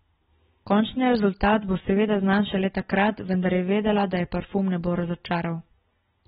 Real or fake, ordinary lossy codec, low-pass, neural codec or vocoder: real; AAC, 16 kbps; 9.9 kHz; none